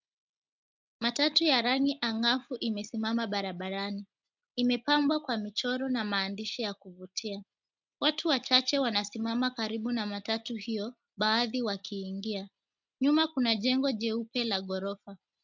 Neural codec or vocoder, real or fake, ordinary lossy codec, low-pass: none; real; MP3, 64 kbps; 7.2 kHz